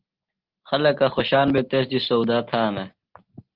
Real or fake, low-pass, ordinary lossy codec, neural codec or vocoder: real; 5.4 kHz; Opus, 16 kbps; none